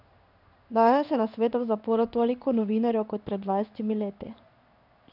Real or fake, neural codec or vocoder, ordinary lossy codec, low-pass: fake; codec, 16 kHz in and 24 kHz out, 1 kbps, XY-Tokenizer; none; 5.4 kHz